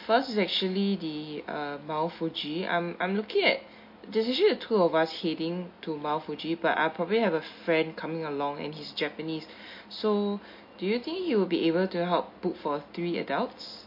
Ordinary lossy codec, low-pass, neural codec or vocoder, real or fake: MP3, 32 kbps; 5.4 kHz; none; real